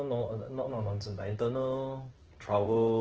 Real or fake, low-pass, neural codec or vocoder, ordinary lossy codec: fake; 7.2 kHz; codec, 16 kHz in and 24 kHz out, 1 kbps, XY-Tokenizer; Opus, 16 kbps